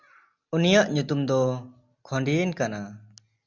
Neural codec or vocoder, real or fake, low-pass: none; real; 7.2 kHz